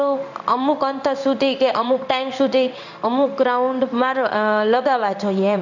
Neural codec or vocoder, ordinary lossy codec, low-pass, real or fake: codec, 16 kHz in and 24 kHz out, 1 kbps, XY-Tokenizer; none; 7.2 kHz; fake